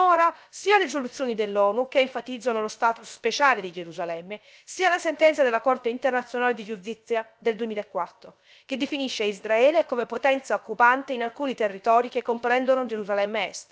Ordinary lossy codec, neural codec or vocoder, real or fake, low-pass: none; codec, 16 kHz, 0.7 kbps, FocalCodec; fake; none